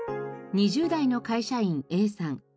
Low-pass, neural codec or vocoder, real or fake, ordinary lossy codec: none; none; real; none